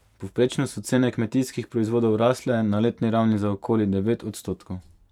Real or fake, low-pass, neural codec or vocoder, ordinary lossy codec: fake; 19.8 kHz; vocoder, 44.1 kHz, 128 mel bands every 512 samples, BigVGAN v2; none